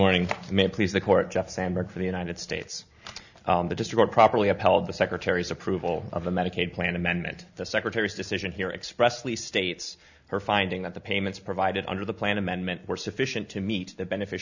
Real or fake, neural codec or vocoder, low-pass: real; none; 7.2 kHz